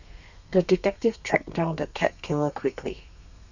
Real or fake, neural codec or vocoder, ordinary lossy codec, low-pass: fake; codec, 32 kHz, 1.9 kbps, SNAC; none; 7.2 kHz